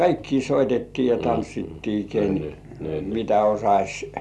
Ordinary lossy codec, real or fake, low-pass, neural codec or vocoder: none; real; none; none